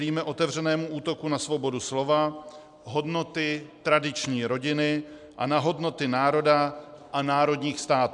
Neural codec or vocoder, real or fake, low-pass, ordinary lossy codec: none; real; 10.8 kHz; MP3, 96 kbps